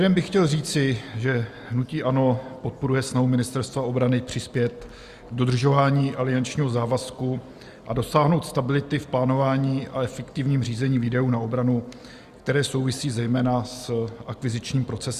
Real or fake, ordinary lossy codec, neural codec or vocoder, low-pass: real; Opus, 64 kbps; none; 14.4 kHz